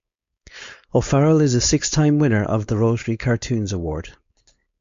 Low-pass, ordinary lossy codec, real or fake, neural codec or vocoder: 7.2 kHz; MP3, 48 kbps; fake; codec, 16 kHz, 4.8 kbps, FACodec